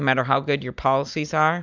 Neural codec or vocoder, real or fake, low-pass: none; real; 7.2 kHz